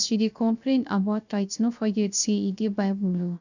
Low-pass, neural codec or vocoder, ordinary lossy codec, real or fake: 7.2 kHz; codec, 16 kHz, 0.3 kbps, FocalCodec; none; fake